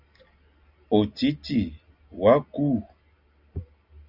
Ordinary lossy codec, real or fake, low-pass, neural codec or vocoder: Opus, 64 kbps; real; 5.4 kHz; none